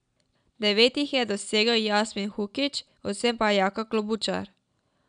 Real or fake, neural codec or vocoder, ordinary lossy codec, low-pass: real; none; none; 9.9 kHz